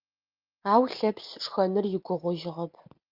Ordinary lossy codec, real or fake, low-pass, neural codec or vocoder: Opus, 24 kbps; real; 5.4 kHz; none